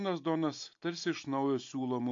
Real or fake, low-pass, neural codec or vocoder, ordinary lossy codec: real; 7.2 kHz; none; AAC, 48 kbps